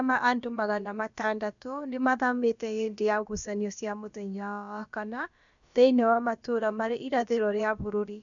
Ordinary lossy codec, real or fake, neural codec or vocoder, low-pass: none; fake; codec, 16 kHz, about 1 kbps, DyCAST, with the encoder's durations; 7.2 kHz